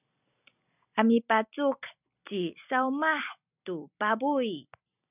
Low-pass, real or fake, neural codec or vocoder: 3.6 kHz; real; none